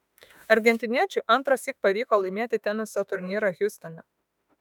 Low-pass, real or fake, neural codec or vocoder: 19.8 kHz; fake; autoencoder, 48 kHz, 32 numbers a frame, DAC-VAE, trained on Japanese speech